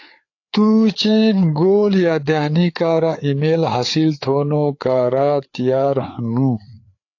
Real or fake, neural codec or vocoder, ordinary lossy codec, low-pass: fake; codec, 16 kHz, 4 kbps, FreqCodec, larger model; AAC, 48 kbps; 7.2 kHz